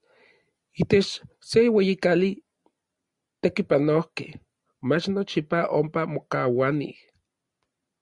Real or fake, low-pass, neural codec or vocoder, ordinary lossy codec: real; 10.8 kHz; none; Opus, 64 kbps